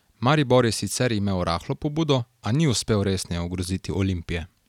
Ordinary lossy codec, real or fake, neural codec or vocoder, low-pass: none; real; none; 19.8 kHz